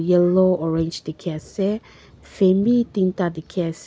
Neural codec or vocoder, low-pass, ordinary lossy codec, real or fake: none; none; none; real